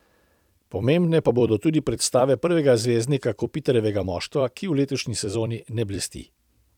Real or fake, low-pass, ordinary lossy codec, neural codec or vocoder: fake; 19.8 kHz; none; vocoder, 44.1 kHz, 128 mel bands every 256 samples, BigVGAN v2